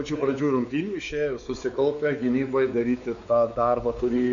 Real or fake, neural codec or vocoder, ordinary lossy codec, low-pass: fake; codec, 16 kHz, 4 kbps, X-Codec, WavLM features, trained on Multilingual LibriSpeech; MP3, 96 kbps; 7.2 kHz